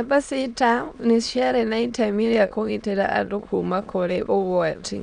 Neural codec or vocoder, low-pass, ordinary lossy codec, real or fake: autoencoder, 22.05 kHz, a latent of 192 numbers a frame, VITS, trained on many speakers; 9.9 kHz; none; fake